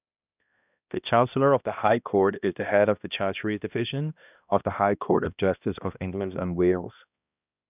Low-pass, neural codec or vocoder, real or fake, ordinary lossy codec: 3.6 kHz; codec, 16 kHz, 1 kbps, X-Codec, HuBERT features, trained on balanced general audio; fake; none